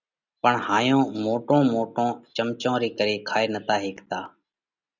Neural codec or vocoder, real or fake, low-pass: none; real; 7.2 kHz